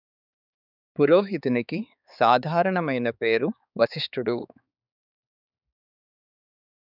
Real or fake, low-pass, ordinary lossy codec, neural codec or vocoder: fake; 5.4 kHz; none; codec, 16 kHz, 4 kbps, X-Codec, HuBERT features, trained on balanced general audio